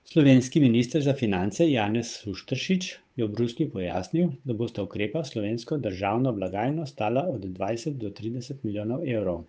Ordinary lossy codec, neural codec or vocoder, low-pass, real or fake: none; codec, 16 kHz, 8 kbps, FunCodec, trained on Chinese and English, 25 frames a second; none; fake